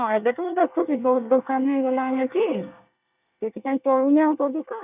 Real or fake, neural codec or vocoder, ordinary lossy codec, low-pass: fake; codec, 24 kHz, 1 kbps, SNAC; none; 3.6 kHz